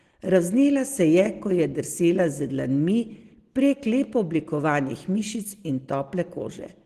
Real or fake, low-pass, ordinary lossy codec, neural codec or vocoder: real; 14.4 kHz; Opus, 16 kbps; none